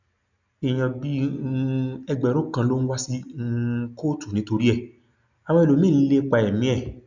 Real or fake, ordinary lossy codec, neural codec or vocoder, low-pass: real; none; none; 7.2 kHz